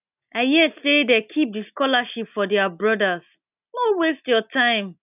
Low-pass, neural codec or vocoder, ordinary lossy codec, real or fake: 3.6 kHz; none; none; real